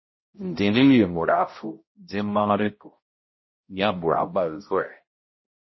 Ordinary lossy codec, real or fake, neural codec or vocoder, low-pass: MP3, 24 kbps; fake; codec, 16 kHz, 0.5 kbps, X-Codec, HuBERT features, trained on balanced general audio; 7.2 kHz